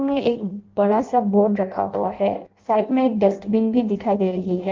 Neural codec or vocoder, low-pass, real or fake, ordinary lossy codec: codec, 16 kHz in and 24 kHz out, 0.6 kbps, FireRedTTS-2 codec; 7.2 kHz; fake; Opus, 32 kbps